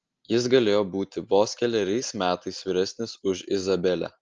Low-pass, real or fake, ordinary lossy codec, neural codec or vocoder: 7.2 kHz; real; Opus, 24 kbps; none